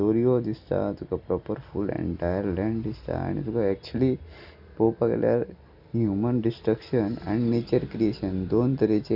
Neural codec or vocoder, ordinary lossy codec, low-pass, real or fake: none; none; 5.4 kHz; real